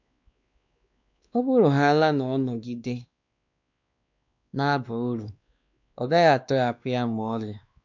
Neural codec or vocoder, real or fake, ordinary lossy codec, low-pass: codec, 16 kHz, 2 kbps, X-Codec, WavLM features, trained on Multilingual LibriSpeech; fake; none; 7.2 kHz